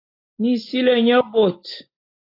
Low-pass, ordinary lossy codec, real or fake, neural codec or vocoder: 5.4 kHz; AAC, 32 kbps; real; none